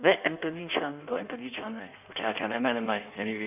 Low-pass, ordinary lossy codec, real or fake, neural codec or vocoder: 3.6 kHz; none; fake; codec, 16 kHz in and 24 kHz out, 1.1 kbps, FireRedTTS-2 codec